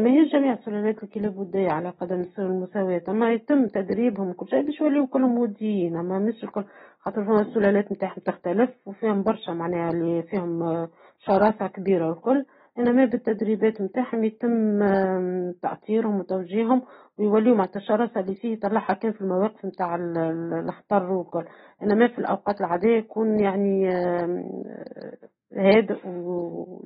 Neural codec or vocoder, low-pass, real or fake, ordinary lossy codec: none; 19.8 kHz; real; AAC, 16 kbps